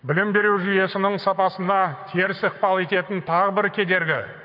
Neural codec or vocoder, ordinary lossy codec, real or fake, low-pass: codec, 44.1 kHz, 7.8 kbps, Pupu-Codec; none; fake; 5.4 kHz